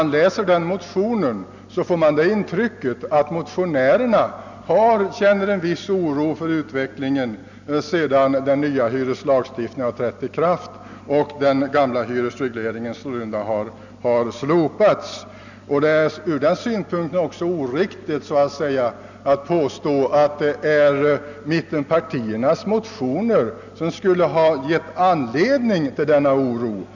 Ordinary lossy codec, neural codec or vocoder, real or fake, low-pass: none; none; real; 7.2 kHz